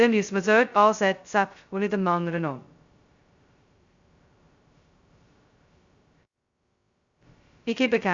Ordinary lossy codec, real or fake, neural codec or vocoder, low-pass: Opus, 64 kbps; fake; codec, 16 kHz, 0.2 kbps, FocalCodec; 7.2 kHz